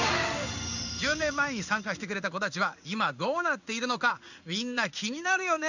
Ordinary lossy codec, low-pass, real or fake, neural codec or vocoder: none; 7.2 kHz; fake; codec, 16 kHz in and 24 kHz out, 1 kbps, XY-Tokenizer